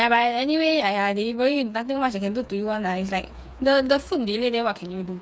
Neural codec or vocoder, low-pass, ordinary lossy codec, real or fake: codec, 16 kHz, 4 kbps, FreqCodec, smaller model; none; none; fake